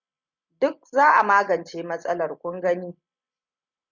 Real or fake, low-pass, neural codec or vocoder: real; 7.2 kHz; none